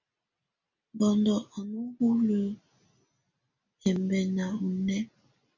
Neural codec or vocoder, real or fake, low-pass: none; real; 7.2 kHz